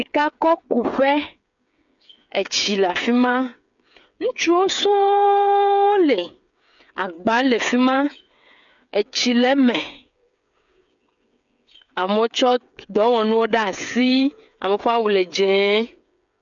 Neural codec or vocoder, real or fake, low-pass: codec, 16 kHz, 8 kbps, FreqCodec, smaller model; fake; 7.2 kHz